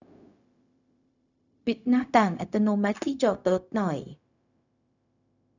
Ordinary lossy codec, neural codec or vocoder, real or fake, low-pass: none; codec, 16 kHz, 0.4 kbps, LongCat-Audio-Codec; fake; 7.2 kHz